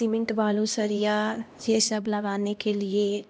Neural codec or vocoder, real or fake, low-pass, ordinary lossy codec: codec, 16 kHz, 1 kbps, X-Codec, HuBERT features, trained on LibriSpeech; fake; none; none